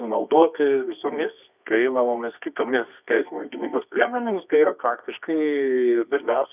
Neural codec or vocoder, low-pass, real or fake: codec, 24 kHz, 0.9 kbps, WavTokenizer, medium music audio release; 3.6 kHz; fake